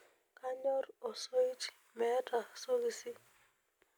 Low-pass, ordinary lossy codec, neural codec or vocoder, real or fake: none; none; none; real